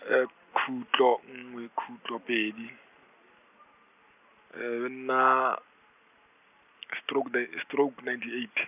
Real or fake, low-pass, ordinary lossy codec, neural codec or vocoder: real; 3.6 kHz; none; none